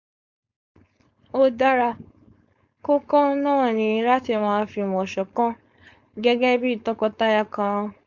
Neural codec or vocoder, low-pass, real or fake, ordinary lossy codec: codec, 16 kHz, 4.8 kbps, FACodec; 7.2 kHz; fake; Opus, 64 kbps